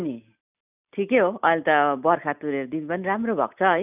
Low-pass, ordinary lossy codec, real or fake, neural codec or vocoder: 3.6 kHz; none; real; none